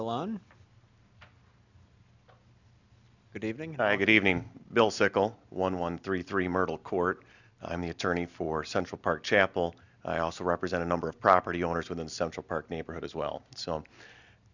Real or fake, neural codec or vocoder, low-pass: real; none; 7.2 kHz